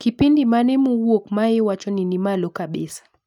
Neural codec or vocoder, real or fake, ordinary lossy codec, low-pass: none; real; none; 19.8 kHz